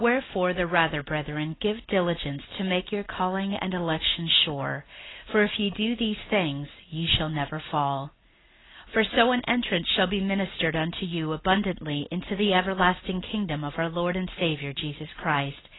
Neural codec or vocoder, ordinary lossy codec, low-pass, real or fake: none; AAC, 16 kbps; 7.2 kHz; real